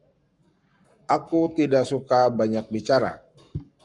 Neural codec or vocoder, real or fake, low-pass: codec, 44.1 kHz, 7.8 kbps, Pupu-Codec; fake; 10.8 kHz